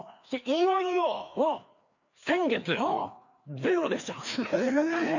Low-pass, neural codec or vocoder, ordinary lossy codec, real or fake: 7.2 kHz; codec, 16 kHz, 2 kbps, FreqCodec, larger model; none; fake